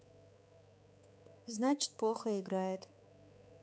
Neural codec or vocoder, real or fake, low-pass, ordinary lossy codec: codec, 16 kHz, 4 kbps, X-Codec, HuBERT features, trained on balanced general audio; fake; none; none